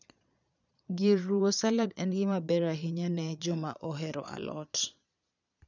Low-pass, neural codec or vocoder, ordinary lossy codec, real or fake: 7.2 kHz; vocoder, 22.05 kHz, 80 mel bands, Vocos; none; fake